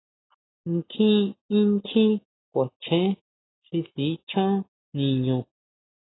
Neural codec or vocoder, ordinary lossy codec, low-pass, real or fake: none; AAC, 16 kbps; 7.2 kHz; real